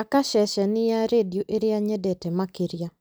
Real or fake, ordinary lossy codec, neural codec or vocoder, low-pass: real; none; none; none